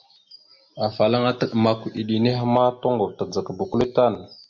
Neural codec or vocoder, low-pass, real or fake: none; 7.2 kHz; real